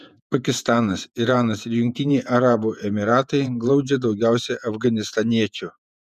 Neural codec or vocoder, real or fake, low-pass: vocoder, 48 kHz, 128 mel bands, Vocos; fake; 14.4 kHz